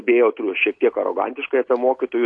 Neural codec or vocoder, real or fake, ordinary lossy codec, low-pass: none; real; AAC, 48 kbps; 9.9 kHz